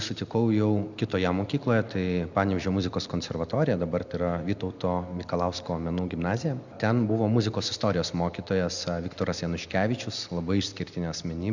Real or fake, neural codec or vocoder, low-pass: real; none; 7.2 kHz